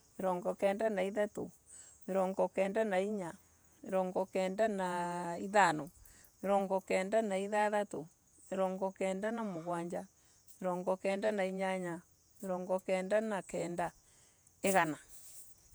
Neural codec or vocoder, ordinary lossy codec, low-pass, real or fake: vocoder, 48 kHz, 128 mel bands, Vocos; none; none; fake